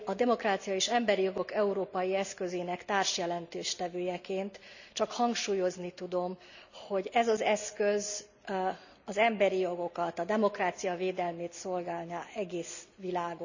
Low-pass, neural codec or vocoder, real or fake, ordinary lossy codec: 7.2 kHz; none; real; none